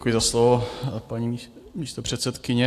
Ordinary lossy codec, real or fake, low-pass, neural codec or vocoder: AAC, 64 kbps; fake; 14.4 kHz; vocoder, 44.1 kHz, 128 mel bands every 256 samples, BigVGAN v2